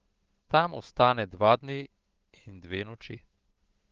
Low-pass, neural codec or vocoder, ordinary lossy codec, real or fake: 7.2 kHz; codec, 16 kHz, 8 kbps, FunCodec, trained on Chinese and English, 25 frames a second; Opus, 16 kbps; fake